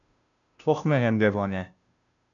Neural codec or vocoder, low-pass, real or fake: codec, 16 kHz, 0.5 kbps, FunCodec, trained on Chinese and English, 25 frames a second; 7.2 kHz; fake